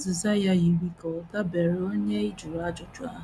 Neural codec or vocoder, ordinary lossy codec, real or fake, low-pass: none; none; real; none